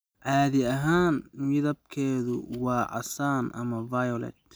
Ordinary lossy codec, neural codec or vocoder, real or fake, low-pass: none; none; real; none